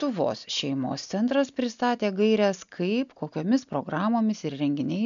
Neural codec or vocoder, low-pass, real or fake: none; 7.2 kHz; real